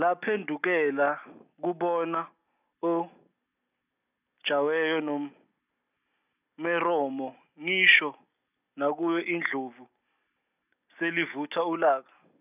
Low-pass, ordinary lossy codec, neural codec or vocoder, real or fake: 3.6 kHz; none; none; real